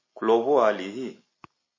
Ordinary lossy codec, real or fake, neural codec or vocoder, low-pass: MP3, 32 kbps; real; none; 7.2 kHz